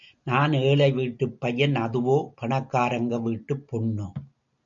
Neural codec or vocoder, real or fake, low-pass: none; real; 7.2 kHz